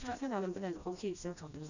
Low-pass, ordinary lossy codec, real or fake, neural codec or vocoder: 7.2 kHz; none; fake; codec, 16 kHz, 1 kbps, FreqCodec, smaller model